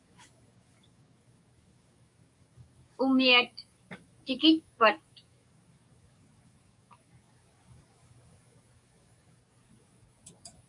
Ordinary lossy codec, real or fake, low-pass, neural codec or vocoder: MP3, 96 kbps; fake; 10.8 kHz; codec, 44.1 kHz, 7.8 kbps, DAC